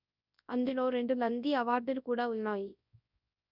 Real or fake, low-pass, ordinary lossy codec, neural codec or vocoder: fake; 5.4 kHz; none; codec, 24 kHz, 0.9 kbps, WavTokenizer, large speech release